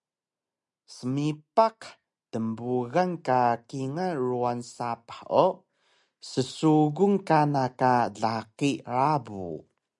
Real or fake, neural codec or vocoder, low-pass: real; none; 10.8 kHz